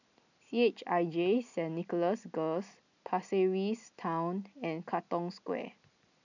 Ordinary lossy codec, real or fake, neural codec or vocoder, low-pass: none; real; none; 7.2 kHz